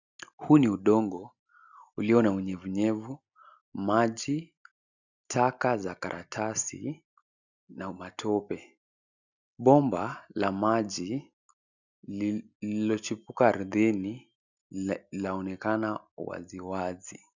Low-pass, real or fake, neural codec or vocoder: 7.2 kHz; real; none